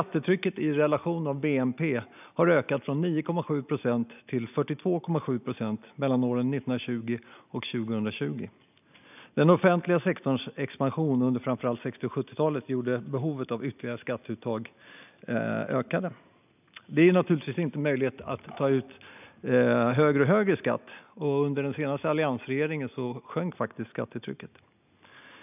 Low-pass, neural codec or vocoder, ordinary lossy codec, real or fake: 3.6 kHz; none; none; real